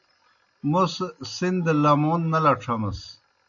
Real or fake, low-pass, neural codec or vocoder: real; 7.2 kHz; none